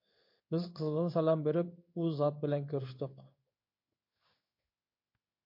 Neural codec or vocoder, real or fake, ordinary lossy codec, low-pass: codec, 16 kHz in and 24 kHz out, 1 kbps, XY-Tokenizer; fake; MP3, 32 kbps; 5.4 kHz